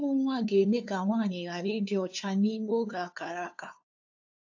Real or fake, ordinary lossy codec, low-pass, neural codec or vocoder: fake; AAC, 48 kbps; 7.2 kHz; codec, 16 kHz, 2 kbps, FunCodec, trained on LibriTTS, 25 frames a second